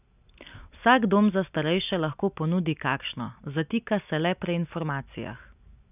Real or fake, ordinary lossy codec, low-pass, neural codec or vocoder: real; none; 3.6 kHz; none